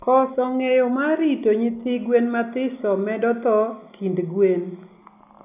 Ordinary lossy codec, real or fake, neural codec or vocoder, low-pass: none; real; none; 3.6 kHz